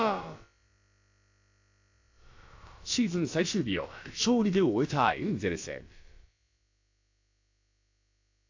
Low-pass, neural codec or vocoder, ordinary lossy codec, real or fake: 7.2 kHz; codec, 16 kHz, about 1 kbps, DyCAST, with the encoder's durations; AAC, 48 kbps; fake